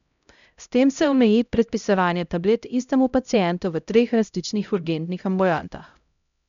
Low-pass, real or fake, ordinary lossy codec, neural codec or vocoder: 7.2 kHz; fake; none; codec, 16 kHz, 0.5 kbps, X-Codec, HuBERT features, trained on LibriSpeech